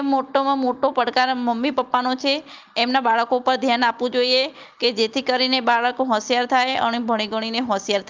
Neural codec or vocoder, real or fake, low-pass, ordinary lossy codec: none; real; 7.2 kHz; Opus, 32 kbps